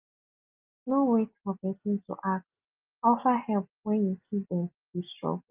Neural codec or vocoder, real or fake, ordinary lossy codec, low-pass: none; real; Opus, 32 kbps; 3.6 kHz